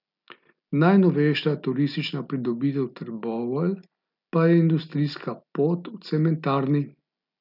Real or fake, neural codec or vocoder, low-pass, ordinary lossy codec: real; none; 5.4 kHz; none